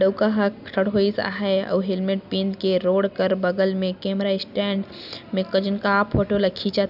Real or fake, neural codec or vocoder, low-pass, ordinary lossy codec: real; none; 5.4 kHz; none